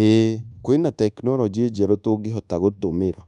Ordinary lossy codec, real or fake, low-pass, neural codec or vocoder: none; fake; 10.8 kHz; codec, 24 kHz, 1.2 kbps, DualCodec